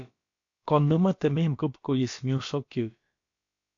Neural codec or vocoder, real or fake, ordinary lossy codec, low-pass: codec, 16 kHz, about 1 kbps, DyCAST, with the encoder's durations; fake; AAC, 48 kbps; 7.2 kHz